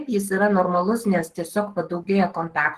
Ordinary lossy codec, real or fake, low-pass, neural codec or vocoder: Opus, 16 kbps; fake; 14.4 kHz; codec, 44.1 kHz, 7.8 kbps, Pupu-Codec